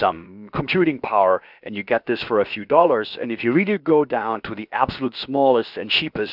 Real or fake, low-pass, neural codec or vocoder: fake; 5.4 kHz; codec, 16 kHz, about 1 kbps, DyCAST, with the encoder's durations